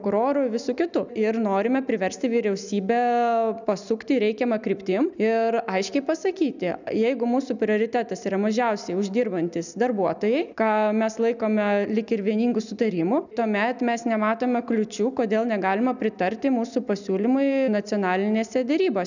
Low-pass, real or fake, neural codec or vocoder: 7.2 kHz; real; none